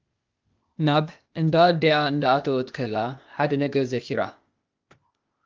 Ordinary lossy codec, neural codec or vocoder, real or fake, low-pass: Opus, 32 kbps; codec, 16 kHz, 0.8 kbps, ZipCodec; fake; 7.2 kHz